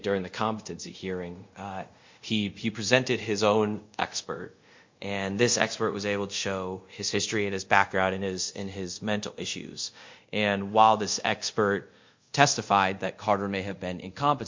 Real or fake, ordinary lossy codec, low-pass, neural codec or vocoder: fake; MP3, 48 kbps; 7.2 kHz; codec, 24 kHz, 0.5 kbps, DualCodec